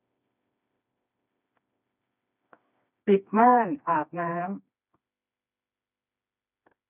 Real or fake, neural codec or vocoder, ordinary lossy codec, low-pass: fake; codec, 16 kHz, 2 kbps, FreqCodec, smaller model; none; 3.6 kHz